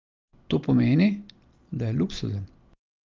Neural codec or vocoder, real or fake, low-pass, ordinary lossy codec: none; real; 7.2 kHz; Opus, 24 kbps